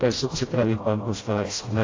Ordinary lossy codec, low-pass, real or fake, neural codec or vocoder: AAC, 32 kbps; 7.2 kHz; fake; codec, 16 kHz, 0.5 kbps, FreqCodec, smaller model